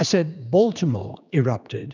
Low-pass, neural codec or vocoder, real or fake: 7.2 kHz; codec, 16 kHz, 2 kbps, X-Codec, HuBERT features, trained on general audio; fake